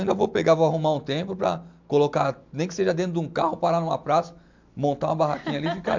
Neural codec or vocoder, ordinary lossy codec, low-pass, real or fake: none; MP3, 64 kbps; 7.2 kHz; real